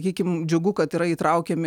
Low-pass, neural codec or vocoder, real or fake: 19.8 kHz; none; real